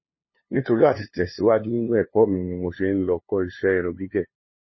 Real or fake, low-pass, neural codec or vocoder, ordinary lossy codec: fake; 7.2 kHz; codec, 16 kHz, 2 kbps, FunCodec, trained on LibriTTS, 25 frames a second; MP3, 24 kbps